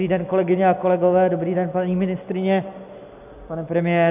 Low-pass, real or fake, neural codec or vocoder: 3.6 kHz; real; none